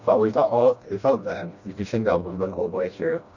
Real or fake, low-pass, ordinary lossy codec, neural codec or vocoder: fake; 7.2 kHz; none; codec, 16 kHz, 1 kbps, FreqCodec, smaller model